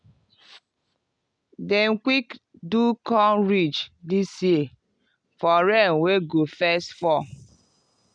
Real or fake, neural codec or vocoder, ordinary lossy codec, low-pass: real; none; none; 9.9 kHz